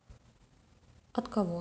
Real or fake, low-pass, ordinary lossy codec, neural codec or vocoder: real; none; none; none